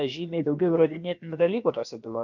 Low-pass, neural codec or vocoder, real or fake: 7.2 kHz; codec, 16 kHz, about 1 kbps, DyCAST, with the encoder's durations; fake